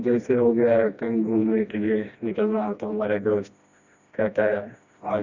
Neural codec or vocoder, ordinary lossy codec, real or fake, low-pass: codec, 16 kHz, 1 kbps, FreqCodec, smaller model; Opus, 64 kbps; fake; 7.2 kHz